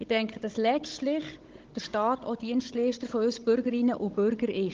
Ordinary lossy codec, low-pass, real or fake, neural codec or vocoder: Opus, 16 kbps; 7.2 kHz; fake; codec, 16 kHz, 16 kbps, FunCodec, trained on Chinese and English, 50 frames a second